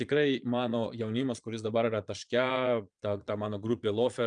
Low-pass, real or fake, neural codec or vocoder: 9.9 kHz; fake; vocoder, 22.05 kHz, 80 mel bands, Vocos